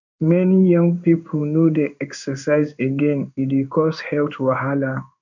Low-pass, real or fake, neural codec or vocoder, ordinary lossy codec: 7.2 kHz; fake; codec, 16 kHz in and 24 kHz out, 1 kbps, XY-Tokenizer; none